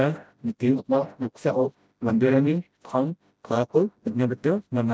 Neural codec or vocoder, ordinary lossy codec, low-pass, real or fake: codec, 16 kHz, 0.5 kbps, FreqCodec, smaller model; none; none; fake